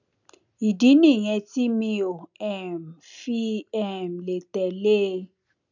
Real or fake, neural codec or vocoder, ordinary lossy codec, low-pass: real; none; none; 7.2 kHz